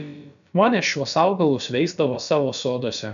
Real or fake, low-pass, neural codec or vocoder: fake; 7.2 kHz; codec, 16 kHz, about 1 kbps, DyCAST, with the encoder's durations